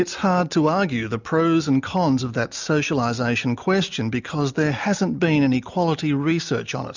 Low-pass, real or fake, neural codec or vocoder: 7.2 kHz; real; none